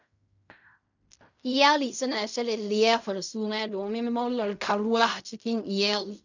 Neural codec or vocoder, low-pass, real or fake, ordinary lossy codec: codec, 16 kHz in and 24 kHz out, 0.4 kbps, LongCat-Audio-Codec, fine tuned four codebook decoder; 7.2 kHz; fake; none